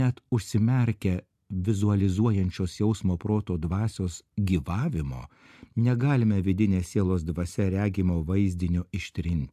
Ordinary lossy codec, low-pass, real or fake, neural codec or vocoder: AAC, 96 kbps; 14.4 kHz; real; none